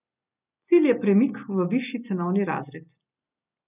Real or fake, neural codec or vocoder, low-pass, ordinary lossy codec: real; none; 3.6 kHz; none